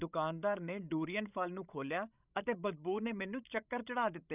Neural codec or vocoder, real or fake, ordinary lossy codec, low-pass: codec, 16 kHz, 16 kbps, FreqCodec, larger model; fake; none; 3.6 kHz